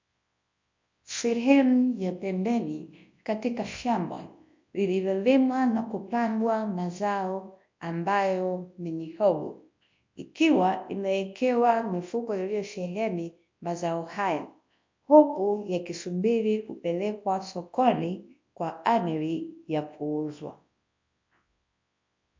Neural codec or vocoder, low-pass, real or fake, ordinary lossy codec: codec, 24 kHz, 0.9 kbps, WavTokenizer, large speech release; 7.2 kHz; fake; MP3, 48 kbps